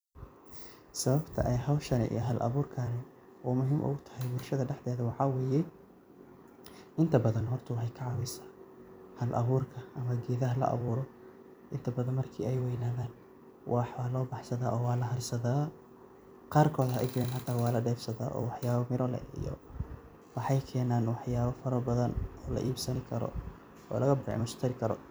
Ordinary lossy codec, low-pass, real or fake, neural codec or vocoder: none; none; fake; vocoder, 44.1 kHz, 128 mel bands every 256 samples, BigVGAN v2